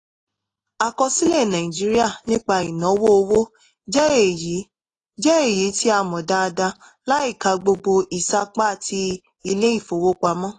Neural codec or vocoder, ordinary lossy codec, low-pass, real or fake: none; AAC, 32 kbps; 10.8 kHz; real